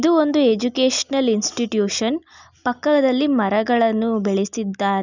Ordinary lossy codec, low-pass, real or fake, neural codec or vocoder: none; 7.2 kHz; real; none